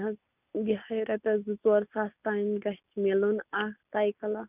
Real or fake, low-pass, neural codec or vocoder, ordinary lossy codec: real; 3.6 kHz; none; none